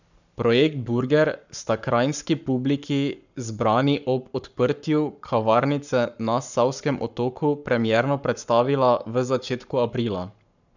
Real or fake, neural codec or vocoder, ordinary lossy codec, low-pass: fake; codec, 44.1 kHz, 7.8 kbps, Pupu-Codec; none; 7.2 kHz